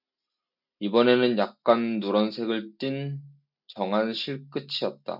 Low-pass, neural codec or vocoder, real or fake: 5.4 kHz; none; real